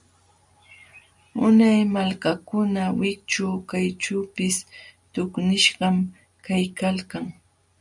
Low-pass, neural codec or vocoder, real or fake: 10.8 kHz; none; real